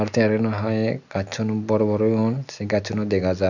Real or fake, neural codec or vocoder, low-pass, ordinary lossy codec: real; none; 7.2 kHz; none